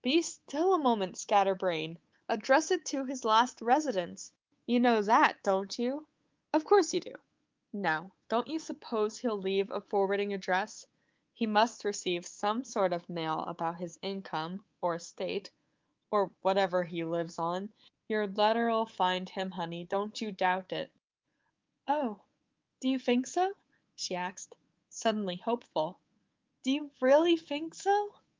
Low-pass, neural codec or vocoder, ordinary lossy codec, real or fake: 7.2 kHz; codec, 24 kHz, 3.1 kbps, DualCodec; Opus, 32 kbps; fake